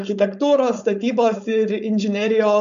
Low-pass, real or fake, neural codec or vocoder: 7.2 kHz; fake; codec, 16 kHz, 4.8 kbps, FACodec